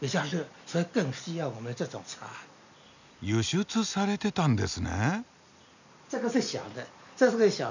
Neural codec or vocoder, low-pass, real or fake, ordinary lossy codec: none; 7.2 kHz; real; none